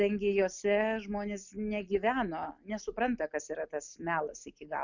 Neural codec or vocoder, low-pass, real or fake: none; 7.2 kHz; real